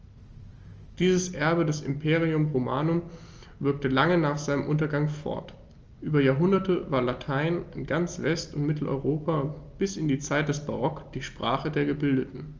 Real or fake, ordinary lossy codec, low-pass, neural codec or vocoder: real; Opus, 24 kbps; 7.2 kHz; none